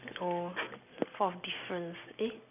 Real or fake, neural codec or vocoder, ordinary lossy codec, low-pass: real; none; none; 3.6 kHz